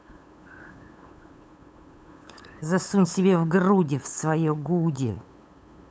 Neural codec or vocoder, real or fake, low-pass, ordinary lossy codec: codec, 16 kHz, 8 kbps, FunCodec, trained on LibriTTS, 25 frames a second; fake; none; none